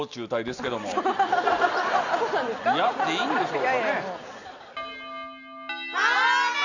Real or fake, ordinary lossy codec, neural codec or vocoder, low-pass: real; none; none; 7.2 kHz